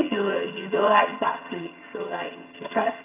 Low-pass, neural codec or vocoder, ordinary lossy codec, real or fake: 3.6 kHz; vocoder, 22.05 kHz, 80 mel bands, HiFi-GAN; none; fake